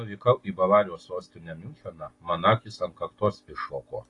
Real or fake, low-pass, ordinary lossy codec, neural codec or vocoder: real; 10.8 kHz; AAC, 32 kbps; none